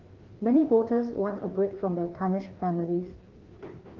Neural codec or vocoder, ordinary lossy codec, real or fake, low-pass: codec, 16 kHz, 2 kbps, FreqCodec, larger model; Opus, 16 kbps; fake; 7.2 kHz